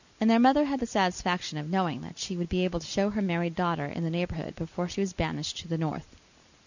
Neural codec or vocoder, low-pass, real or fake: none; 7.2 kHz; real